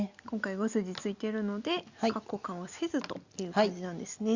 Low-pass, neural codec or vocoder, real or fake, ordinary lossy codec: 7.2 kHz; none; real; Opus, 64 kbps